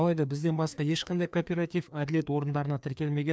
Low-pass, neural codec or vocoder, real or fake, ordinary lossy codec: none; codec, 16 kHz, 2 kbps, FreqCodec, larger model; fake; none